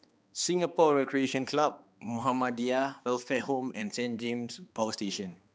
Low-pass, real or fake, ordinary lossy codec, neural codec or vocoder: none; fake; none; codec, 16 kHz, 2 kbps, X-Codec, HuBERT features, trained on balanced general audio